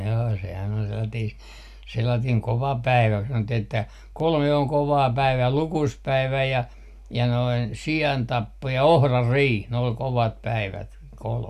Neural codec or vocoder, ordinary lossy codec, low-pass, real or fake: none; none; 14.4 kHz; real